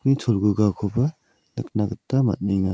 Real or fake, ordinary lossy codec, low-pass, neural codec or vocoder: real; none; none; none